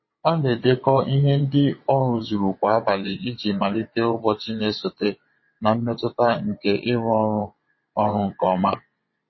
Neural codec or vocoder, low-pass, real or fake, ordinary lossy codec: vocoder, 22.05 kHz, 80 mel bands, WaveNeXt; 7.2 kHz; fake; MP3, 24 kbps